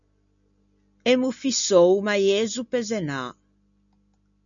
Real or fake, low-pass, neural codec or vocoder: real; 7.2 kHz; none